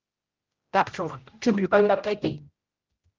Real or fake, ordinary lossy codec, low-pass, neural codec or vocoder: fake; Opus, 32 kbps; 7.2 kHz; codec, 16 kHz, 0.5 kbps, X-Codec, HuBERT features, trained on general audio